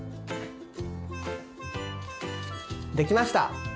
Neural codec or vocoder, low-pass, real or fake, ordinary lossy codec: none; none; real; none